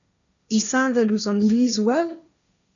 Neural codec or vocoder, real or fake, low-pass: codec, 16 kHz, 1.1 kbps, Voila-Tokenizer; fake; 7.2 kHz